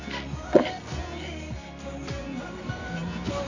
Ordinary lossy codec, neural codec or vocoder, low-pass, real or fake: AAC, 48 kbps; codec, 24 kHz, 0.9 kbps, WavTokenizer, medium music audio release; 7.2 kHz; fake